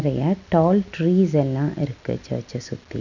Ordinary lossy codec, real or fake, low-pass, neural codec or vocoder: none; real; 7.2 kHz; none